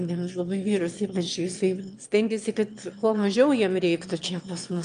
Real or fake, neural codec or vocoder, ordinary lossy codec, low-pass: fake; autoencoder, 22.05 kHz, a latent of 192 numbers a frame, VITS, trained on one speaker; Opus, 32 kbps; 9.9 kHz